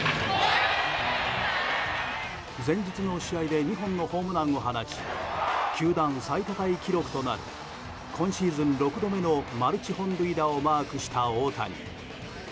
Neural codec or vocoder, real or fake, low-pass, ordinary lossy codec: none; real; none; none